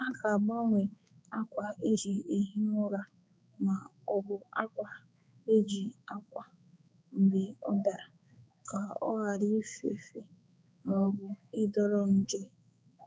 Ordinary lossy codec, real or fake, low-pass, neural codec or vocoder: none; fake; none; codec, 16 kHz, 4 kbps, X-Codec, HuBERT features, trained on balanced general audio